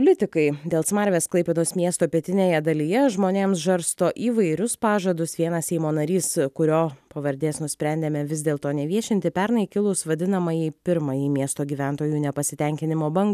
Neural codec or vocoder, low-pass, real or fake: none; 14.4 kHz; real